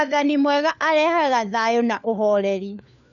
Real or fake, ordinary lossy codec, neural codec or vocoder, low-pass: fake; none; codec, 16 kHz, 4 kbps, FunCodec, trained on LibriTTS, 50 frames a second; 7.2 kHz